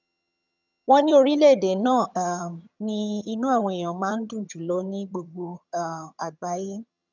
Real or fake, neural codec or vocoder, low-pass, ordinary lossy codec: fake; vocoder, 22.05 kHz, 80 mel bands, HiFi-GAN; 7.2 kHz; none